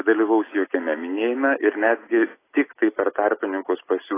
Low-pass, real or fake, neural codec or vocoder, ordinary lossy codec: 3.6 kHz; real; none; AAC, 16 kbps